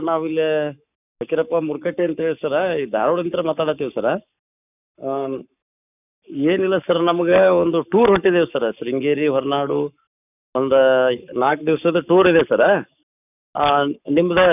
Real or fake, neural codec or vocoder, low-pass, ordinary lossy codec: fake; codec, 44.1 kHz, 7.8 kbps, Pupu-Codec; 3.6 kHz; none